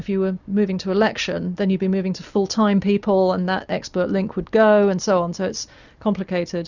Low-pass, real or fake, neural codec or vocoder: 7.2 kHz; real; none